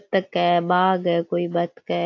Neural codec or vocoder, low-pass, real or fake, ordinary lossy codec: none; 7.2 kHz; real; AAC, 48 kbps